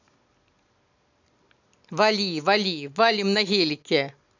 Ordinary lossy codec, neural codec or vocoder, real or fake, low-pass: none; none; real; 7.2 kHz